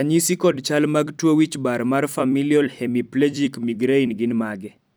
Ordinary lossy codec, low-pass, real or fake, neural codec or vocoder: none; none; fake; vocoder, 44.1 kHz, 128 mel bands every 256 samples, BigVGAN v2